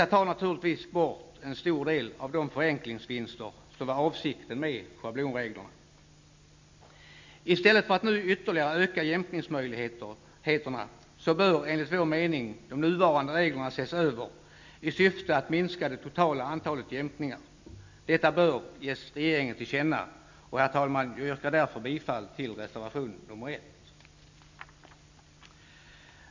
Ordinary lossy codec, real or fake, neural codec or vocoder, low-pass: MP3, 64 kbps; real; none; 7.2 kHz